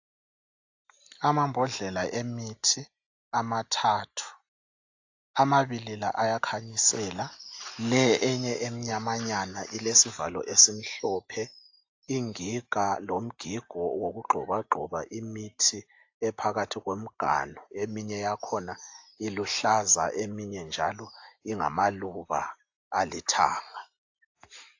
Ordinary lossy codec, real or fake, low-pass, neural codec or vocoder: AAC, 48 kbps; real; 7.2 kHz; none